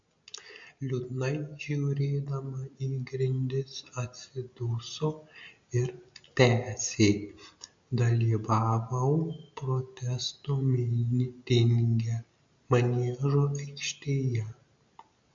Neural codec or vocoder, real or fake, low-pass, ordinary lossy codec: none; real; 7.2 kHz; MP3, 64 kbps